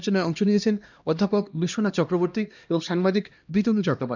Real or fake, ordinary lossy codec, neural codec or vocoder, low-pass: fake; none; codec, 16 kHz, 1 kbps, X-Codec, HuBERT features, trained on LibriSpeech; 7.2 kHz